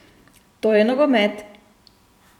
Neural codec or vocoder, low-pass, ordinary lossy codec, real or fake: vocoder, 44.1 kHz, 128 mel bands every 512 samples, BigVGAN v2; 19.8 kHz; none; fake